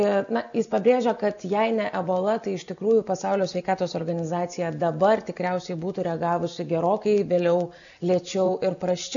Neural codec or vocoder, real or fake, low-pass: none; real; 7.2 kHz